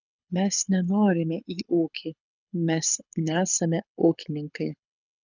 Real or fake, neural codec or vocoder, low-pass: fake; codec, 24 kHz, 6 kbps, HILCodec; 7.2 kHz